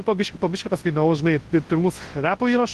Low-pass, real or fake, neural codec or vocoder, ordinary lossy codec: 10.8 kHz; fake; codec, 24 kHz, 0.9 kbps, WavTokenizer, large speech release; Opus, 16 kbps